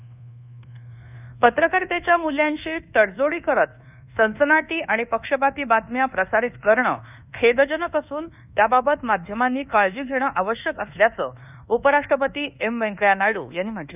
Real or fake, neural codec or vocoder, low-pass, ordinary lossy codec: fake; codec, 24 kHz, 1.2 kbps, DualCodec; 3.6 kHz; none